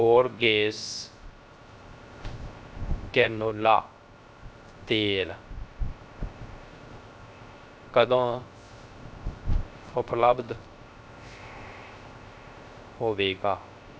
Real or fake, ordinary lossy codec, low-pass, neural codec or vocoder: fake; none; none; codec, 16 kHz, 0.3 kbps, FocalCodec